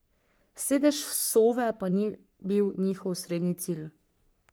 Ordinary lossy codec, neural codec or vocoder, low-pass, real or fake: none; codec, 44.1 kHz, 3.4 kbps, Pupu-Codec; none; fake